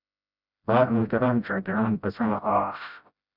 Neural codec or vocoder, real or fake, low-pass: codec, 16 kHz, 0.5 kbps, FreqCodec, smaller model; fake; 5.4 kHz